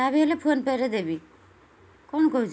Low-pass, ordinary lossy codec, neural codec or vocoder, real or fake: none; none; none; real